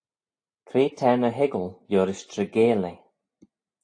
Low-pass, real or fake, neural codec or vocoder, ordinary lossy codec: 9.9 kHz; real; none; AAC, 48 kbps